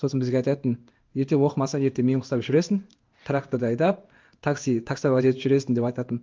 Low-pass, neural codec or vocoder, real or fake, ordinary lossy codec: 7.2 kHz; codec, 16 kHz in and 24 kHz out, 1 kbps, XY-Tokenizer; fake; Opus, 32 kbps